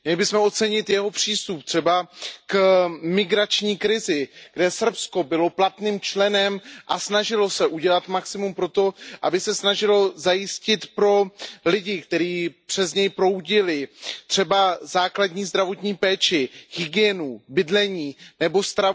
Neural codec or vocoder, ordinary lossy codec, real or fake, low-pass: none; none; real; none